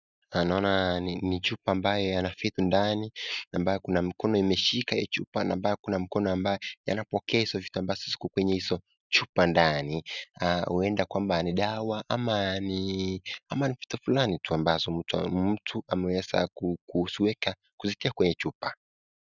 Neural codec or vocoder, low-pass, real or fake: none; 7.2 kHz; real